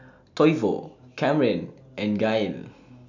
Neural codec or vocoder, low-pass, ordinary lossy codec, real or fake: none; 7.2 kHz; none; real